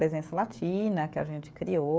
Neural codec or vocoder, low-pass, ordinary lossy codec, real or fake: codec, 16 kHz, 16 kbps, FreqCodec, smaller model; none; none; fake